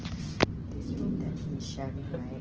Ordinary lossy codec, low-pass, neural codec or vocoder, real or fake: Opus, 16 kbps; 7.2 kHz; none; real